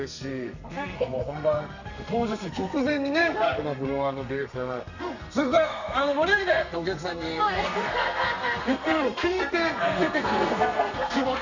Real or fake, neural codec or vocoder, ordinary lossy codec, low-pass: fake; codec, 32 kHz, 1.9 kbps, SNAC; none; 7.2 kHz